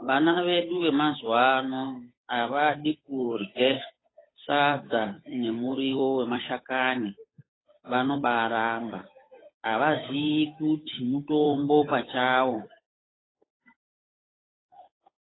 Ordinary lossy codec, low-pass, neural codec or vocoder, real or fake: AAC, 16 kbps; 7.2 kHz; codec, 16 kHz, 8 kbps, FunCodec, trained on Chinese and English, 25 frames a second; fake